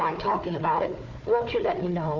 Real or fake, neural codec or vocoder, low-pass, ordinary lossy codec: fake; codec, 16 kHz, 4 kbps, FunCodec, trained on Chinese and English, 50 frames a second; 7.2 kHz; MP3, 64 kbps